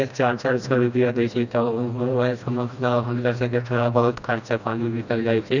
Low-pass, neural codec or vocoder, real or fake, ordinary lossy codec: 7.2 kHz; codec, 16 kHz, 1 kbps, FreqCodec, smaller model; fake; none